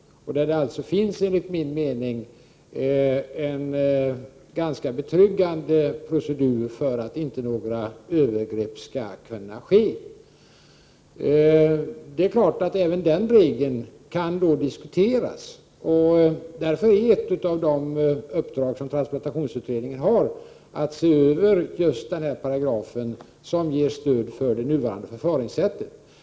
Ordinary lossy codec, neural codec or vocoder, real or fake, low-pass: none; none; real; none